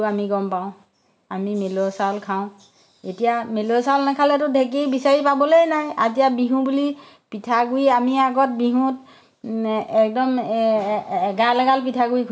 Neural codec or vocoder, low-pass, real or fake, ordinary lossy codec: none; none; real; none